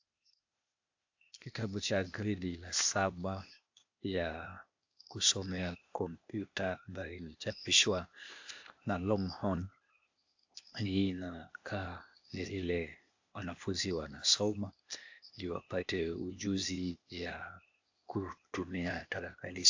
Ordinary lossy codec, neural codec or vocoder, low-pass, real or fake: AAC, 48 kbps; codec, 16 kHz, 0.8 kbps, ZipCodec; 7.2 kHz; fake